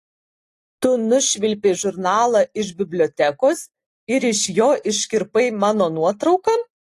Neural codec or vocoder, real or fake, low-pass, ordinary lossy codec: none; real; 14.4 kHz; AAC, 48 kbps